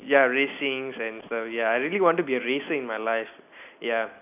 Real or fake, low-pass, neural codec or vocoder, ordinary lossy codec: real; 3.6 kHz; none; none